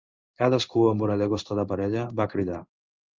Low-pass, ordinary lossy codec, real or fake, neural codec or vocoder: 7.2 kHz; Opus, 32 kbps; fake; codec, 16 kHz in and 24 kHz out, 1 kbps, XY-Tokenizer